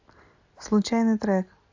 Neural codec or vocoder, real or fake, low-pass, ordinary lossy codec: none; real; 7.2 kHz; AAC, 48 kbps